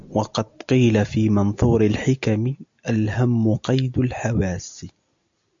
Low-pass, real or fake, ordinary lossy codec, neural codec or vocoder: 7.2 kHz; real; AAC, 64 kbps; none